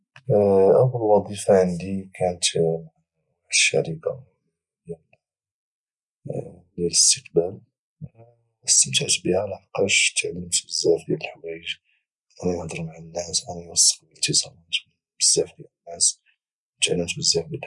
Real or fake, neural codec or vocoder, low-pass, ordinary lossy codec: real; none; 10.8 kHz; none